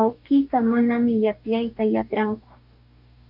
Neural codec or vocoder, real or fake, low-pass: codec, 44.1 kHz, 2.6 kbps, DAC; fake; 5.4 kHz